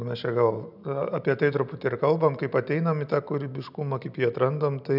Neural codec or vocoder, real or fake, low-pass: none; real; 5.4 kHz